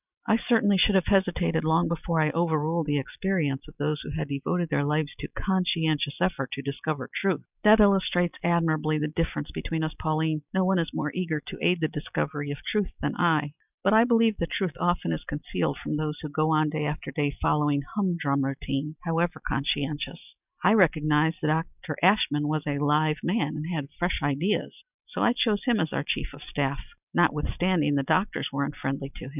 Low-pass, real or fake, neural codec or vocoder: 3.6 kHz; real; none